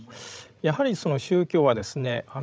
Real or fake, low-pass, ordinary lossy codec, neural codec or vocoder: fake; none; none; codec, 16 kHz, 16 kbps, FreqCodec, larger model